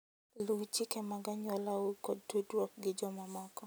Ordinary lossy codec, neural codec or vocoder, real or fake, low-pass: none; none; real; none